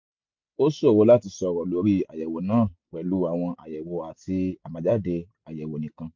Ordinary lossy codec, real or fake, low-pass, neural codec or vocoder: MP3, 48 kbps; real; 7.2 kHz; none